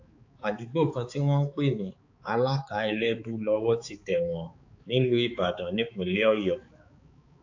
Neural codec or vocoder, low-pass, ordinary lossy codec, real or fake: codec, 16 kHz, 4 kbps, X-Codec, HuBERT features, trained on balanced general audio; 7.2 kHz; AAC, 48 kbps; fake